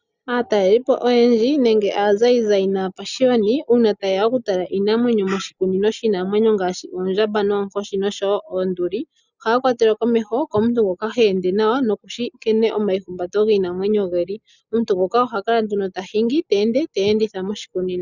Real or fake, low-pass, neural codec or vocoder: real; 7.2 kHz; none